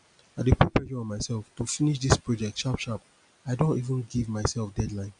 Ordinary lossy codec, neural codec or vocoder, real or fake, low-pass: none; none; real; 9.9 kHz